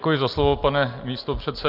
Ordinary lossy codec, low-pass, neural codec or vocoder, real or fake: Opus, 32 kbps; 5.4 kHz; none; real